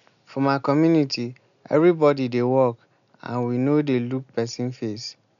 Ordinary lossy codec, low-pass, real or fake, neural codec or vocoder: none; 7.2 kHz; real; none